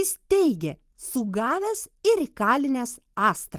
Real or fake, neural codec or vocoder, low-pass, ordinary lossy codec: fake; vocoder, 44.1 kHz, 128 mel bands every 512 samples, BigVGAN v2; 14.4 kHz; Opus, 24 kbps